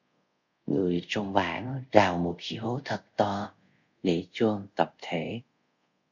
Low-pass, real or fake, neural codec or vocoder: 7.2 kHz; fake; codec, 24 kHz, 0.5 kbps, DualCodec